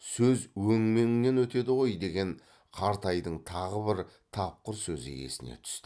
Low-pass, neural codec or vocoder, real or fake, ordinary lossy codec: none; none; real; none